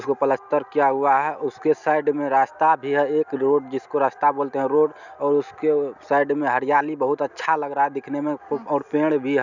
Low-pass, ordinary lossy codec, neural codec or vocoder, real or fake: 7.2 kHz; none; none; real